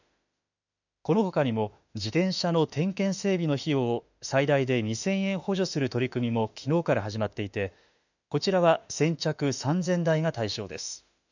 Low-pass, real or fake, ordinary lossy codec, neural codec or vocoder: 7.2 kHz; fake; none; autoencoder, 48 kHz, 32 numbers a frame, DAC-VAE, trained on Japanese speech